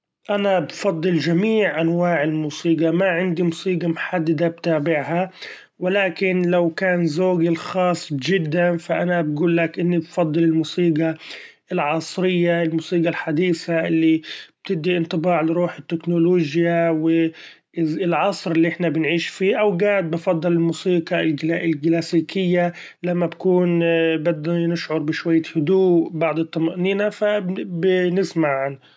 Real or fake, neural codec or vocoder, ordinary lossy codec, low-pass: real; none; none; none